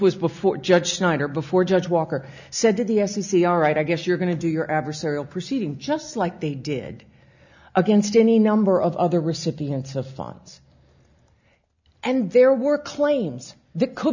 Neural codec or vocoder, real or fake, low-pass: none; real; 7.2 kHz